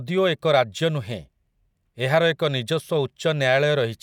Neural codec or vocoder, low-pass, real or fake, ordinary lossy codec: none; 19.8 kHz; real; none